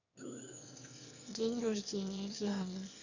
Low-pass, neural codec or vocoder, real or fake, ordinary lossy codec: 7.2 kHz; autoencoder, 22.05 kHz, a latent of 192 numbers a frame, VITS, trained on one speaker; fake; none